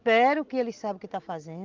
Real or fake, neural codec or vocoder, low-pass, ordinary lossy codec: real; none; 7.2 kHz; Opus, 32 kbps